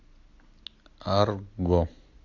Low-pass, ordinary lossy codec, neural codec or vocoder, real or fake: 7.2 kHz; AAC, 48 kbps; none; real